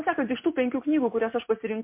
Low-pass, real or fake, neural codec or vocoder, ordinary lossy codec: 3.6 kHz; real; none; MP3, 24 kbps